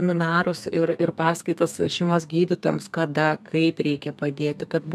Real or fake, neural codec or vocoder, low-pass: fake; codec, 32 kHz, 1.9 kbps, SNAC; 14.4 kHz